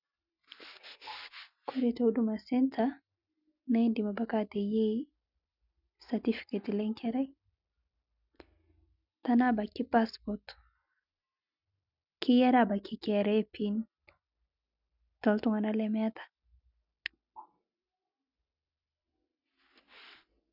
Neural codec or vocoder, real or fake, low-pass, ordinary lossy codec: none; real; 5.4 kHz; none